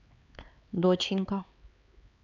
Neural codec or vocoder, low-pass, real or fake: codec, 16 kHz, 4 kbps, X-Codec, HuBERT features, trained on LibriSpeech; 7.2 kHz; fake